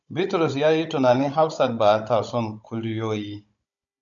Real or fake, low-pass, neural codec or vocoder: fake; 7.2 kHz; codec, 16 kHz, 16 kbps, FunCodec, trained on Chinese and English, 50 frames a second